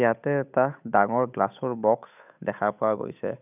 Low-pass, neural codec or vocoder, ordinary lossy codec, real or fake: 3.6 kHz; codec, 16 kHz, 8 kbps, FunCodec, trained on Chinese and English, 25 frames a second; none; fake